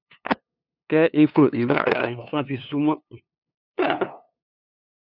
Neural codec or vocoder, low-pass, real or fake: codec, 16 kHz, 2 kbps, FunCodec, trained on LibriTTS, 25 frames a second; 5.4 kHz; fake